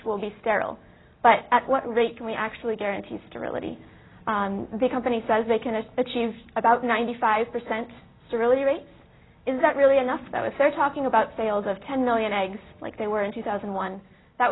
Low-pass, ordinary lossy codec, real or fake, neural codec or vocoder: 7.2 kHz; AAC, 16 kbps; real; none